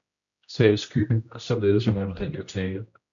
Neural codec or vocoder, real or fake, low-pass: codec, 16 kHz, 1 kbps, X-Codec, HuBERT features, trained on general audio; fake; 7.2 kHz